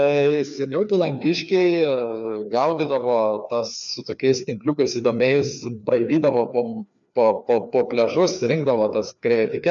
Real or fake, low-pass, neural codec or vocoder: fake; 7.2 kHz; codec, 16 kHz, 2 kbps, FreqCodec, larger model